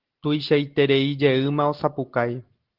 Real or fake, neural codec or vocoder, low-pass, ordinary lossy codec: real; none; 5.4 kHz; Opus, 16 kbps